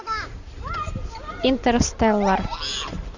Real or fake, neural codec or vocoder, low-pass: real; none; 7.2 kHz